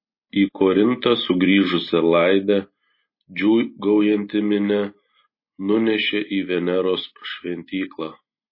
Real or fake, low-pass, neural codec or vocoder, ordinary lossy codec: real; 5.4 kHz; none; MP3, 24 kbps